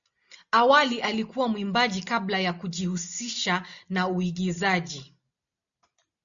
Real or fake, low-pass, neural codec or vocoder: real; 7.2 kHz; none